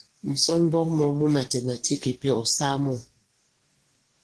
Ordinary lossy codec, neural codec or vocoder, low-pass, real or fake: Opus, 16 kbps; codec, 44.1 kHz, 2.6 kbps, DAC; 10.8 kHz; fake